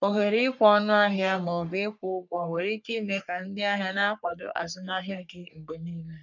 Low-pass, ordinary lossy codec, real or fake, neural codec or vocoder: 7.2 kHz; none; fake; codec, 44.1 kHz, 3.4 kbps, Pupu-Codec